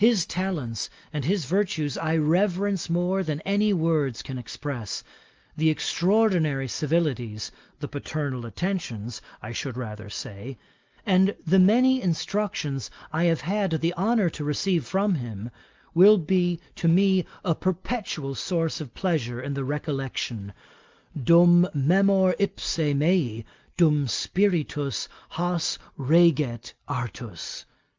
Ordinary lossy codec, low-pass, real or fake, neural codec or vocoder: Opus, 24 kbps; 7.2 kHz; real; none